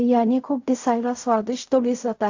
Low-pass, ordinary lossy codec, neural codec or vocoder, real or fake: 7.2 kHz; AAC, 48 kbps; codec, 16 kHz in and 24 kHz out, 0.4 kbps, LongCat-Audio-Codec, fine tuned four codebook decoder; fake